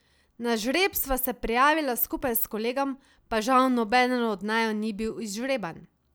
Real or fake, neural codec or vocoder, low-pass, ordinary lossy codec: real; none; none; none